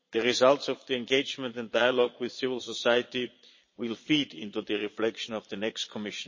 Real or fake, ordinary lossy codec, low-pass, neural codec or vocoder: fake; MP3, 32 kbps; 7.2 kHz; vocoder, 22.05 kHz, 80 mel bands, Vocos